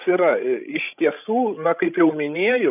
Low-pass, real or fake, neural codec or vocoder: 3.6 kHz; fake; codec, 16 kHz, 16 kbps, FreqCodec, larger model